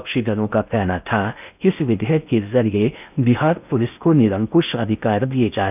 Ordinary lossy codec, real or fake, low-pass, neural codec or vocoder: none; fake; 3.6 kHz; codec, 16 kHz in and 24 kHz out, 0.6 kbps, FocalCodec, streaming, 4096 codes